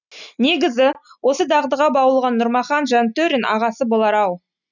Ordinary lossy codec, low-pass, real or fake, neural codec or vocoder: none; 7.2 kHz; real; none